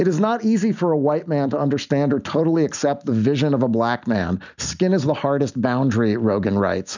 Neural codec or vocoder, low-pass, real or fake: none; 7.2 kHz; real